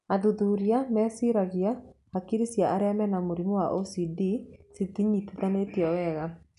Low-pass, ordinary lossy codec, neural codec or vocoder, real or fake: 10.8 kHz; none; none; real